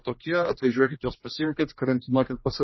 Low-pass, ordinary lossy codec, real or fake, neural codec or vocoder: 7.2 kHz; MP3, 24 kbps; fake; codec, 16 kHz, 1 kbps, X-Codec, HuBERT features, trained on general audio